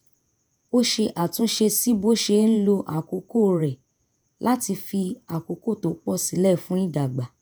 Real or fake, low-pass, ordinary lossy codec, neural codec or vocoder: fake; none; none; vocoder, 48 kHz, 128 mel bands, Vocos